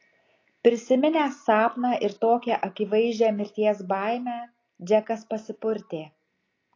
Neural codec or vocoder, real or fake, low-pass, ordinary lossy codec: none; real; 7.2 kHz; AAC, 32 kbps